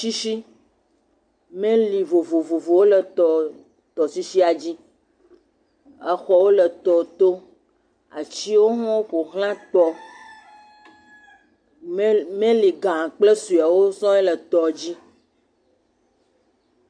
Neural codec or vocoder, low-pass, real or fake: none; 9.9 kHz; real